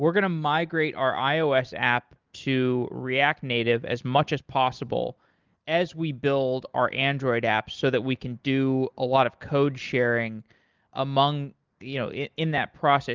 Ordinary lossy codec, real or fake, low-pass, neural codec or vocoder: Opus, 32 kbps; real; 7.2 kHz; none